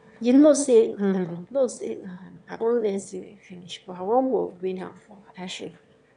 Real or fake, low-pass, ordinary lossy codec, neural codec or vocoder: fake; 9.9 kHz; none; autoencoder, 22.05 kHz, a latent of 192 numbers a frame, VITS, trained on one speaker